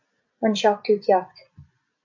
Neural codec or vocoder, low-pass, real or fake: none; 7.2 kHz; real